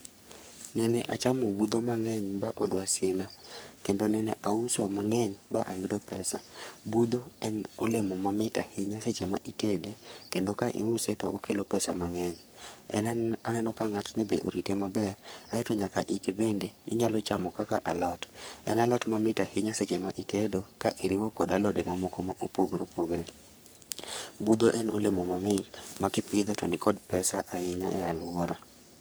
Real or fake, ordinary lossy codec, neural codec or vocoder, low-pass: fake; none; codec, 44.1 kHz, 3.4 kbps, Pupu-Codec; none